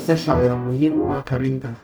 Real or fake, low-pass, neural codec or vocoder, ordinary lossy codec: fake; none; codec, 44.1 kHz, 0.9 kbps, DAC; none